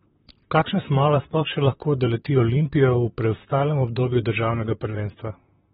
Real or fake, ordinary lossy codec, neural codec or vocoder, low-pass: fake; AAC, 16 kbps; vocoder, 44.1 kHz, 128 mel bands, Pupu-Vocoder; 19.8 kHz